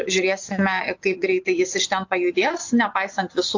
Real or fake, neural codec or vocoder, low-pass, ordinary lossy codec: fake; vocoder, 24 kHz, 100 mel bands, Vocos; 7.2 kHz; AAC, 48 kbps